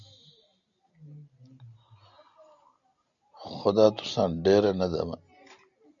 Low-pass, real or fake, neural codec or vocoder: 7.2 kHz; real; none